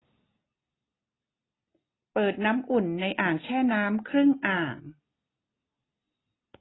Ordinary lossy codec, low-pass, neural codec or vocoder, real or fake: AAC, 16 kbps; 7.2 kHz; none; real